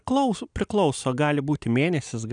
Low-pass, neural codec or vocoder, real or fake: 9.9 kHz; none; real